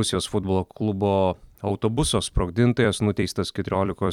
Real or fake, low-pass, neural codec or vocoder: fake; 19.8 kHz; vocoder, 44.1 kHz, 128 mel bands every 256 samples, BigVGAN v2